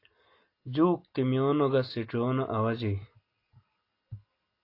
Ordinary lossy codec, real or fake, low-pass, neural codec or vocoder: AAC, 32 kbps; real; 5.4 kHz; none